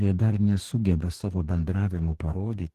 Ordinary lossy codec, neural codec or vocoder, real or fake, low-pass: Opus, 16 kbps; codec, 44.1 kHz, 2.6 kbps, DAC; fake; 14.4 kHz